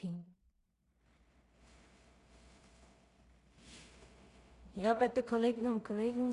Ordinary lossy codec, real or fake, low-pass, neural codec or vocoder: Opus, 64 kbps; fake; 10.8 kHz; codec, 16 kHz in and 24 kHz out, 0.4 kbps, LongCat-Audio-Codec, two codebook decoder